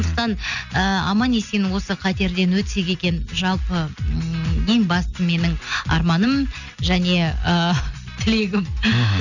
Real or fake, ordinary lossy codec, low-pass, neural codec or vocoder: real; none; 7.2 kHz; none